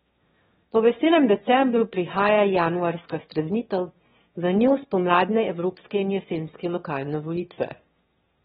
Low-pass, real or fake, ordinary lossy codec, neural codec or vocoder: 9.9 kHz; fake; AAC, 16 kbps; autoencoder, 22.05 kHz, a latent of 192 numbers a frame, VITS, trained on one speaker